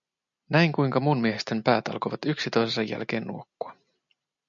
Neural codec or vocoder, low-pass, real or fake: none; 7.2 kHz; real